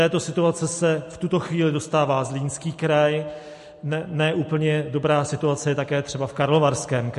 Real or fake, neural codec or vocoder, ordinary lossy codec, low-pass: real; none; MP3, 48 kbps; 14.4 kHz